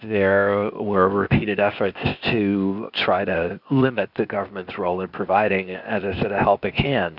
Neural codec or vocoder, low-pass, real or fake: codec, 16 kHz, 0.8 kbps, ZipCodec; 5.4 kHz; fake